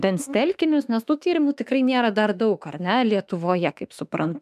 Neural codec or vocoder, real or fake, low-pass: autoencoder, 48 kHz, 32 numbers a frame, DAC-VAE, trained on Japanese speech; fake; 14.4 kHz